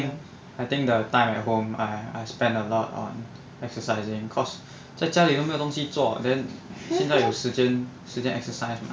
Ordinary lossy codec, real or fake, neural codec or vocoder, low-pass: none; real; none; none